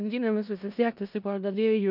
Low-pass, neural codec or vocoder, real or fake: 5.4 kHz; codec, 16 kHz in and 24 kHz out, 0.4 kbps, LongCat-Audio-Codec, four codebook decoder; fake